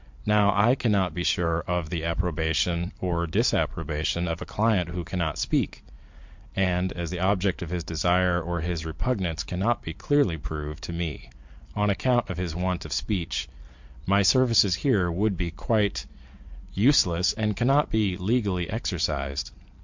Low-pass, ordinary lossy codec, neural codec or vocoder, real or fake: 7.2 kHz; MP3, 64 kbps; vocoder, 44.1 kHz, 128 mel bands every 512 samples, BigVGAN v2; fake